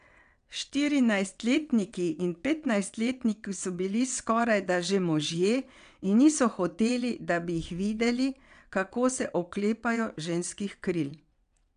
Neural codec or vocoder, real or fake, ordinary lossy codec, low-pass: vocoder, 22.05 kHz, 80 mel bands, WaveNeXt; fake; none; 9.9 kHz